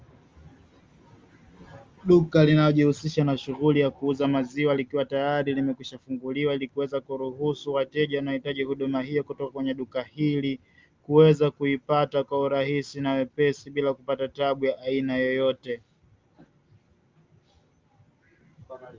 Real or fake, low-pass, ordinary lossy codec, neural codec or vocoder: real; 7.2 kHz; Opus, 32 kbps; none